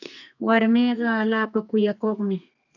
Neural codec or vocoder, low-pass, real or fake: codec, 32 kHz, 1.9 kbps, SNAC; 7.2 kHz; fake